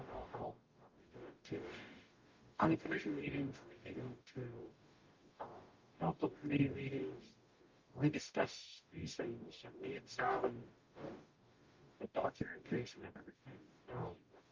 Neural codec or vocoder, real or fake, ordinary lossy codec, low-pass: codec, 44.1 kHz, 0.9 kbps, DAC; fake; Opus, 32 kbps; 7.2 kHz